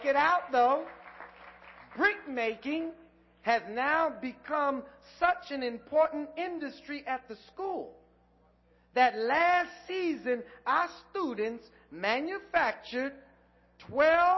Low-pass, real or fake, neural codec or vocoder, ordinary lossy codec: 7.2 kHz; real; none; MP3, 24 kbps